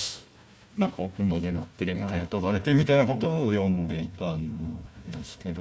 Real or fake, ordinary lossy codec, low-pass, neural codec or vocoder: fake; none; none; codec, 16 kHz, 1 kbps, FunCodec, trained on Chinese and English, 50 frames a second